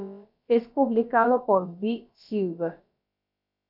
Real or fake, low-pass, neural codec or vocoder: fake; 5.4 kHz; codec, 16 kHz, about 1 kbps, DyCAST, with the encoder's durations